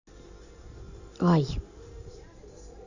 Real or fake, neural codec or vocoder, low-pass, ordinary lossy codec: real; none; 7.2 kHz; none